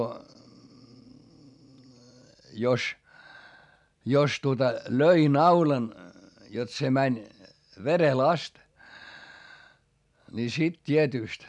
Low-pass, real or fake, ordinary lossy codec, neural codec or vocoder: 10.8 kHz; real; none; none